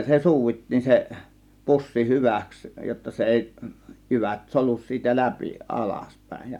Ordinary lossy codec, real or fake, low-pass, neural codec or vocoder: none; real; 19.8 kHz; none